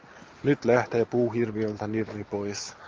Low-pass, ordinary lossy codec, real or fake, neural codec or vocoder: 7.2 kHz; Opus, 32 kbps; real; none